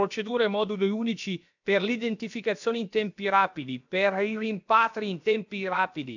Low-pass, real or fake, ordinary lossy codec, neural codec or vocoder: 7.2 kHz; fake; none; codec, 16 kHz, about 1 kbps, DyCAST, with the encoder's durations